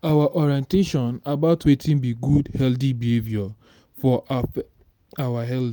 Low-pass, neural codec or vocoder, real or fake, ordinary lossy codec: none; none; real; none